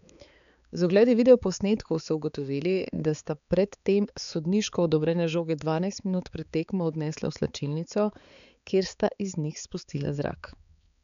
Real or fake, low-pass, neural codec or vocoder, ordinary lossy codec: fake; 7.2 kHz; codec, 16 kHz, 4 kbps, X-Codec, HuBERT features, trained on balanced general audio; none